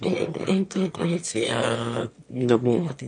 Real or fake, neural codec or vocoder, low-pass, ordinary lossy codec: fake; autoencoder, 22.05 kHz, a latent of 192 numbers a frame, VITS, trained on one speaker; 9.9 kHz; MP3, 48 kbps